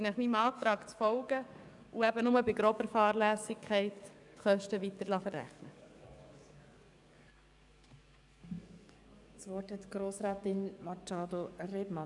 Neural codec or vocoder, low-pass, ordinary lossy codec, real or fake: codec, 44.1 kHz, 7.8 kbps, DAC; 10.8 kHz; none; fake